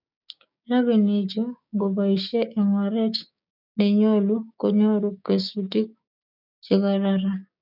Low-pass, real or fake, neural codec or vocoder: 5.4 kHz; fake; codec, 16 kHz, 6 kbps, DAC